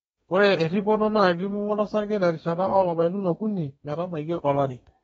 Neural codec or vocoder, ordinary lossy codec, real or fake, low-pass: codec, 32 kHz, 1.9 kbps, SNAC; AAC, 24 kbps; fake; 14.4 kHz